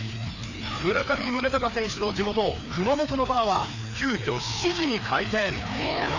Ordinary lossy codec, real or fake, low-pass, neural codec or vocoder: none; fake; 7.2 kHz; codec, 16 kHz, 2 kbps, FreqCodec, larger model